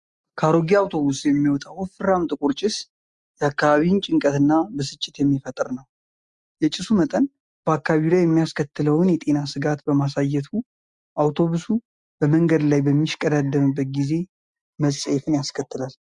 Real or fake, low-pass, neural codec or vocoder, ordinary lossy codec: real; 10.8 kHz; none; Opus, 64 kbps